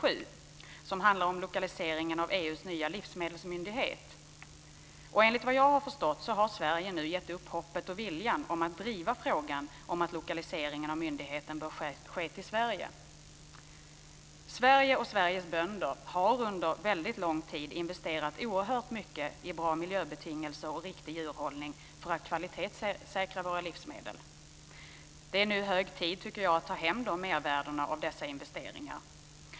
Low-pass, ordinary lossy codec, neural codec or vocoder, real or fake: none; none; none; real